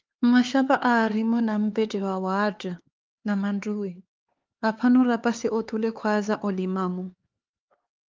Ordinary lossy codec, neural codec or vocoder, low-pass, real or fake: Opus, 32 kbps; codec, 16 kHz, 4 kbps, X-Codec, HuBERT features, trained on LibriSpeech; 7.2 kHz; fake